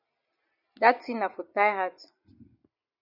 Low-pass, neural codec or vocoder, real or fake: 5.4 kHz; none; real